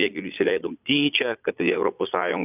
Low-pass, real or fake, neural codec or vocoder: 3.6 kHz; fake; vocoder, 22.05 kHz, 80 mel bands, Vocos